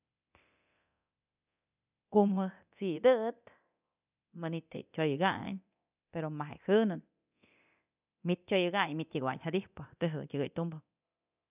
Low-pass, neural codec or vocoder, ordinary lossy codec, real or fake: 3.6 kHz; codec, 24 kHz, 0.9 kbps, DualCodec; none; fake